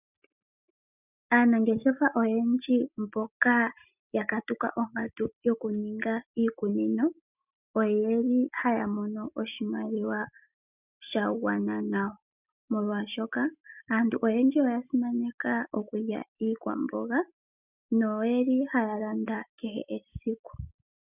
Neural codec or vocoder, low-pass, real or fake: none; 3.6 kHz; real